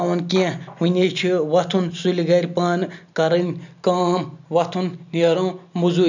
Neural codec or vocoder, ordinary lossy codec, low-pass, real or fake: none; none; 7.2 kHz; real